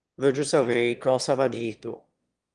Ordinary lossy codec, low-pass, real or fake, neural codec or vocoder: Opus, 24 kbps; 9.9 kHz; fake; autoencoder, 22.05 kHz, a latent of 192 numbers a frame, VITS, trained on one speaker